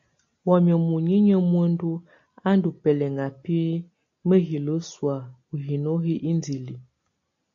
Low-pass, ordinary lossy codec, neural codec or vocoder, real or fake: 7.2 kHz; AAC, 48 kbps; none; real